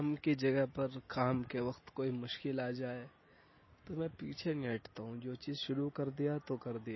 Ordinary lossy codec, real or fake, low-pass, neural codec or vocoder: MP3, 24 kbps; real; 7.2 kHz; none